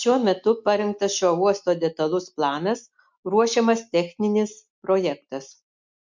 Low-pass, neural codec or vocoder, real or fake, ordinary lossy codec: 7.2 kHz; none; real; MP3, 64 kbps